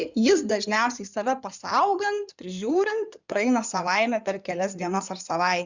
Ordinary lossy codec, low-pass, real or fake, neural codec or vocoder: Opus, 64 kbps; 7.2 kHz; fake; codec, 16 kHz in and 24 kHz out, 2.2 kbps, FireRedTTS-2 codec